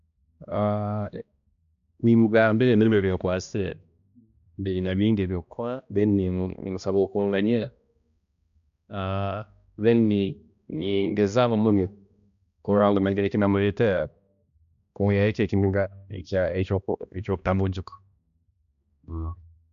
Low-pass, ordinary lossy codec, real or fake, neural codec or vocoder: 7.2 kHz; none; fake; codec, 16 kHz, 1 kbps, X-Codec, HuBERT features, trained on balanced general audio